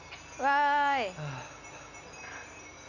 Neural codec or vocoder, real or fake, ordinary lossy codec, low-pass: none; real; none; 7.2 kHz